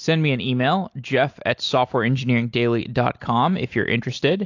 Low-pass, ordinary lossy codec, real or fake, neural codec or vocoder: 7.2 kHz; AAC, 48 kbps; real; none